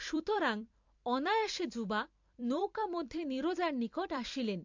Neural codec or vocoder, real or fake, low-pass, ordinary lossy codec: vocoder, 44.1 kHz, 128 mel bands every 256 samples, BigVGAN v2; fake; 7.2 kHz; MP3, 48 kbps